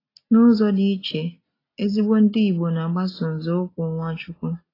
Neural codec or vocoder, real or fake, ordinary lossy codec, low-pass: none; real; AAC, 24 kbps; 5.4 kHz